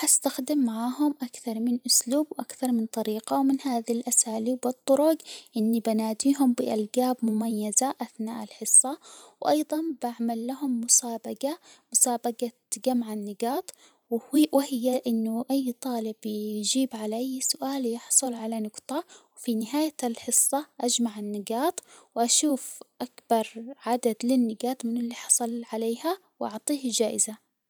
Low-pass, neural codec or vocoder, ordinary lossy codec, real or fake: none; vocoder, 44.1 kHz, 128 mel bands every 512 samples, BigVGAN v2; none; fake